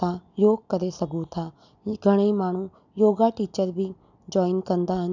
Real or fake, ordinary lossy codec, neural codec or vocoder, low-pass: real; none; none; 7.2 kHz